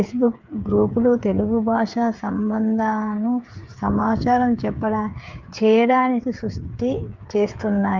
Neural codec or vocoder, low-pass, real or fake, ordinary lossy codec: codec, 16 kHz, 8 kbps, FreqCodec, smaller model; 7.2 kHz; fake; Opus, 24 kbps